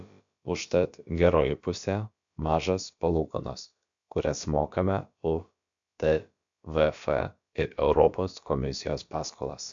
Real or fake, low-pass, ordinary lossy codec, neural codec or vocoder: fake; 7.2 kHz; MP3, 64 kbps; codec, 16 kHz, about 1 kbps, DyCAST, with the encoder's durations